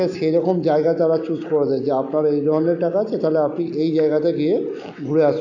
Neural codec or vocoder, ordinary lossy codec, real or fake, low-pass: none; none; real; 7.2 kHz